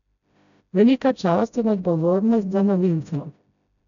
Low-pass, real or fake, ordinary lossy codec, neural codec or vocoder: 7.2 kHz; fake; none; codec, 16 kHz, 0.5 kbps, FreqCodec, smaller model